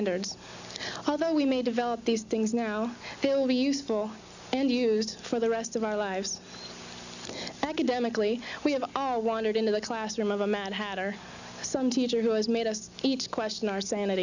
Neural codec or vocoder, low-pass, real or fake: none; 7.2 kHz; real